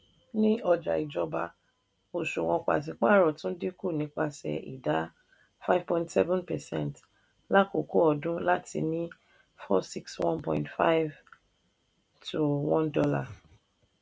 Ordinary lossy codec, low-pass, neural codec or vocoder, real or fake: none; none; none; real